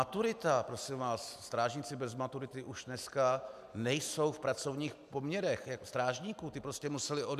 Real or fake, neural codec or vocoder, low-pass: real; none; 14.4 kHz